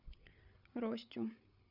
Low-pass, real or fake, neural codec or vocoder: 5.4 kHz; fake; codec, 16 kHz, 8 kbps, FreqCodec, larger model